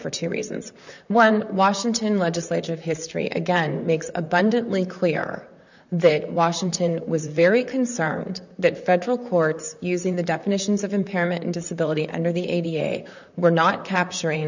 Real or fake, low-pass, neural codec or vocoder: fake; 7.2 kHz; vocoder, 44.1 kHz, 128 mel bands, Pupu-Vocoder